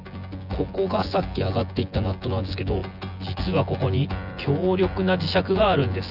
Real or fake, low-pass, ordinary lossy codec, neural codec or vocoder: fake; 5.4 kHz; none; vocoder, 24 kHz, 100 mel bands, Vocos